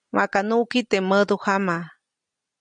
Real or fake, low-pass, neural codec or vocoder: real; 9.9 kHz; none